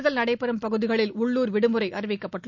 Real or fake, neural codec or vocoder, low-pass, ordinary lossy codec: real; none; 7.2 kHz; none